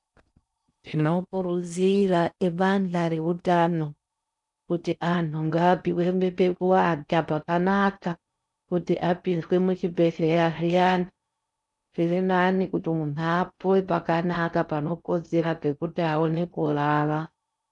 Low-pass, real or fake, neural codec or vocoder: 10.8 kHz; fake; codec, 16 kHz in and 24 kHz out, 0.6 kbps, FocalCodec, streaming, 4096 codes